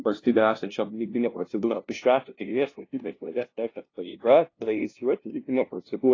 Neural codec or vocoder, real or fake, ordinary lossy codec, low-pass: codec, 16 kHz, 0.5 kbps, FunCodec, trained on LibriTTS, 25 frames a second; fake; AAC, 32 kbps; 7.2 kHz